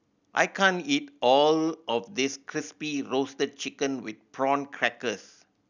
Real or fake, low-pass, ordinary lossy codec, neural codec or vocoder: real; 7.2 kHz; none; none